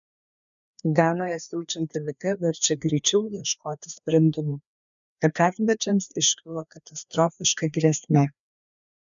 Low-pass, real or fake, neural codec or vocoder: 7.2 kHz; fake; codec, 16 kHz, 2 kbps, FreqCodec, larger model